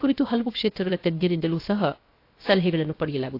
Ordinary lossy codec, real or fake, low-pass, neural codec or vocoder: AAC, 32 kbps; fake; 5.4 kHz; codec, 16 kHz, 0.7 kbps, FocalCodec